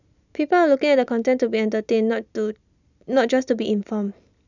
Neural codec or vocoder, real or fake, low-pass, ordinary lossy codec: none; real; 7.2 kHz; none